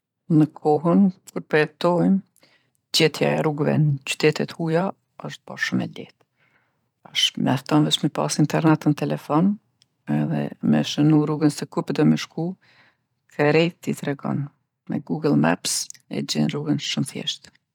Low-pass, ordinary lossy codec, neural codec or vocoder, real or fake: 19.8 kHz; none; vocoder, 44.1 kHz, 128 mel bands every 512 samples, BigVGAN v2; fake